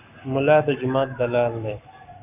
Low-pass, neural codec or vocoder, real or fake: 3.6 kHz; codec, 44.1 kHz, 7.8 kbps, Pupu-Codec; fake